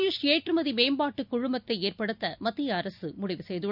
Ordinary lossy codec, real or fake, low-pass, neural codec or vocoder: none; real; 5.4 kHz; none